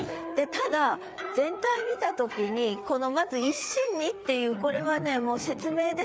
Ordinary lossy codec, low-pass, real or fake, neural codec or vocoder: none; none; fake; codec, 16 kHz, 4 kbps, FreqCodec, larger model